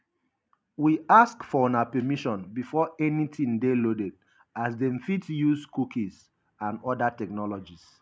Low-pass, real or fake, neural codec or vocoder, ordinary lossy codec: none; real; none; none